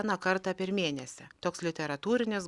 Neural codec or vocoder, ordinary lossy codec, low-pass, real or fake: none; Opus, 64 kbps; 10.8 kHz; real